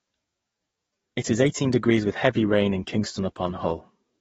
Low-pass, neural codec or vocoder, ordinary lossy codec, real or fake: 19.8 kHz; codec, 44.1 kHz, 7.8 kbps, DAC; AAC, 24 kbps; fake